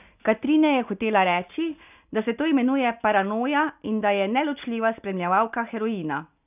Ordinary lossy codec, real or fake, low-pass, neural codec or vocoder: none; real; 3.6 kHz; none